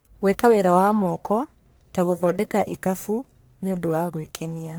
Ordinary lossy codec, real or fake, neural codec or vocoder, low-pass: none; fake; codec, 44.1 kHz, 1.7 kbps, Pupu-Codec; none